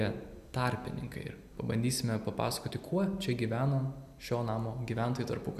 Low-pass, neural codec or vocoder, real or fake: 14.4 kHz; none; real